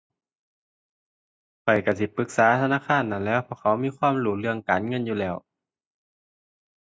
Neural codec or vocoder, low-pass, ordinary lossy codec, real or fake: none; none; none; real